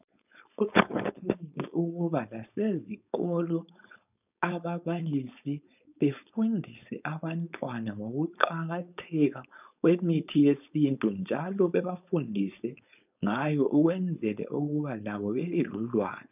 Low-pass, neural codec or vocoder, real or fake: 3.6 kHz; codec, 16 kHz, 4.8 kbps, FACodec; fake